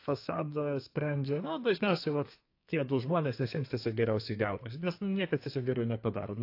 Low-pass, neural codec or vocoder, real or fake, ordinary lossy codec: 5.4 kHz; codec, 32 kHz, 1.9 kbps, SNAC; fake; AAC, 32 kbps